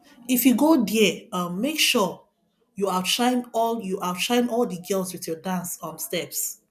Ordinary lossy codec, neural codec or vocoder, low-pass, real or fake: none; none; 14.4 kHz; real